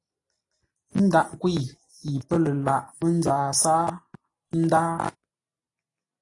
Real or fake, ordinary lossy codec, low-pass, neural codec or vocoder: real; AAC, 32 kbps; 10.8 kHz; none